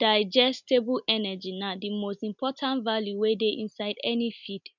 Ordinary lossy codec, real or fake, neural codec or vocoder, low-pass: none; real; none; 7.2 kHz